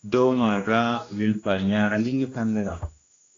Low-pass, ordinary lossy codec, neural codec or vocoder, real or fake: 7.2 kHz; AAC, 32 kbps; codec, 16 kHz, 1 kbps, X-Codec, HuBERT features, trained on general audio; fake